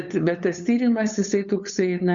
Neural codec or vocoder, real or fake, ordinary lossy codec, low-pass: codec, 16 kHz, 8 kbps, FunCodec, trained on LibriTTS, 25 frames a second; fake; Opus, 64 kbps; 7.2 kHz